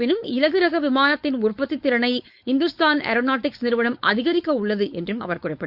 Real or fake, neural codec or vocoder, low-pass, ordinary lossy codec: fake; codec, 16 kHz, 4.8 kbps, FACodec; 5.4 kHz; Opus, 64 kbps